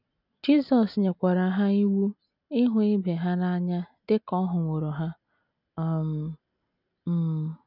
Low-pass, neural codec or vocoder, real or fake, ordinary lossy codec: 5.4 kHz; none; real; none